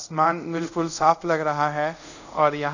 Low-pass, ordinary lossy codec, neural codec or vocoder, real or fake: 7.2 kHz; none; codec, 24 kHz, 0.5 kbps, DualCodec; fake